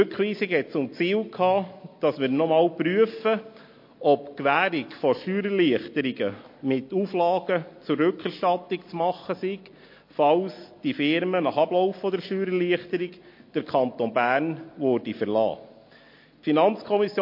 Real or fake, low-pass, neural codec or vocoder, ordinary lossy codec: real; 5.4 kHz; none; MP3, 32 kbps